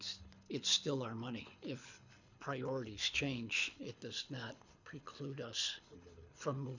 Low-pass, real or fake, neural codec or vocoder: 7.2 kHz; fake; codec, 24 kHz, 6 kbps, HILCodec